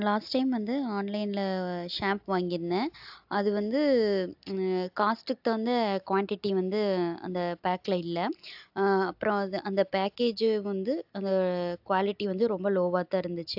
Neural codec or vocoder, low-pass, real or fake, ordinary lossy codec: none; 5.4 kHz; real; AAC, 48 kbps